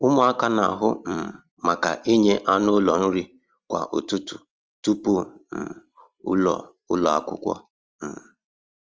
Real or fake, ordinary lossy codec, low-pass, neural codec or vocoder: real; Opus, 24 kbps; 7.2 kHz; none